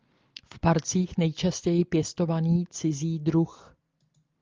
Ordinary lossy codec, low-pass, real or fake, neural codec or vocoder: Opus, 24 kbps; 7.2 kHz; real; none